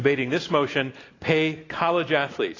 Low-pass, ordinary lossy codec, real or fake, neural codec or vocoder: 7.2 kHz; AAC, 32 kbps; real; none